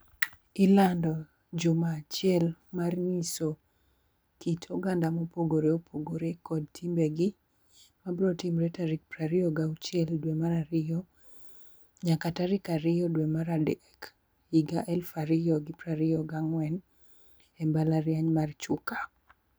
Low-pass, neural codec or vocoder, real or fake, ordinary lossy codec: none; none; real; none